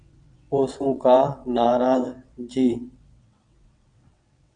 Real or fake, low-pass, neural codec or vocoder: fake; 9.9 kHz; vocoder, 22.05 kHz, 80 mel bands, WaveNeXt